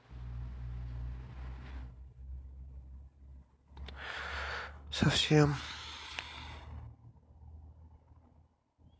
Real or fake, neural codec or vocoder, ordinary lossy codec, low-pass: real; none; none; none